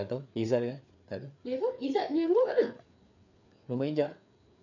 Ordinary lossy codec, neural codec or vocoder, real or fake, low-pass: none; codec, 16 kHz, 4 kbps, FreqCodec, larger model; fake; 7.2 kHz